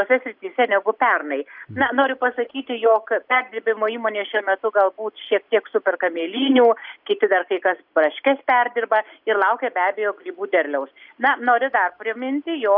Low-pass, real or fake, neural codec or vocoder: 5.4 kHz; real; none